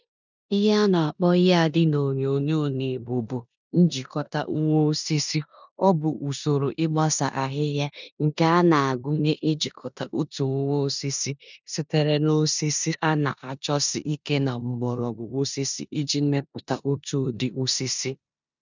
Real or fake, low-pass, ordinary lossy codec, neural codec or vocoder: fake; 7.2 kHz; none; codec, 16 kHz in and 24 kHz out, 0.9 kbps, LongCat-Audio-Codec, four codebook decoder